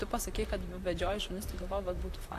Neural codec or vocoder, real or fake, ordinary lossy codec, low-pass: vocoder, 44.1 kHz, 128 mel bands, Pupu-Vocoder; fake; AAC, 64 kbps; 14.4 kHz